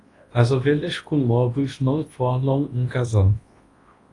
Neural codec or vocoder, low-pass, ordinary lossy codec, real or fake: codec, 24 kHz, 0.9 kbps, WavTokenizer, large speech release; 10.8 kHz; AAC, 32 kbps; fake